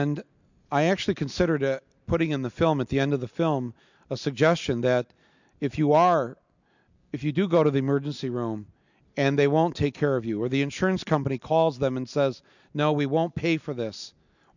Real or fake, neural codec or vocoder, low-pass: real; none; 7.2 kHz